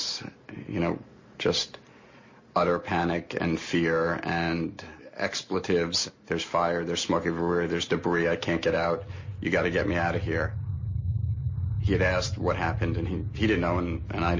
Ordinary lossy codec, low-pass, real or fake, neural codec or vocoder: MP3, 32 kbps; 7.2 kHz; real; none